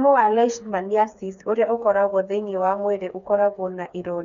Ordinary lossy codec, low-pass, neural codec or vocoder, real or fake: none; 7.2 kHz; codec, 16 kHz, 4 kbps, FreqCodec, smaller model; fake